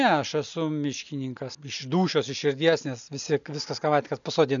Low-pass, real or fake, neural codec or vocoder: 7.2 kHz; real; none